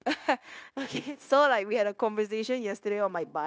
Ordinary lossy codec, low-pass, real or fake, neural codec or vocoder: none; none; fake; codec, 16 kHz, 0.9 kbps, LongCat-Audio-Codec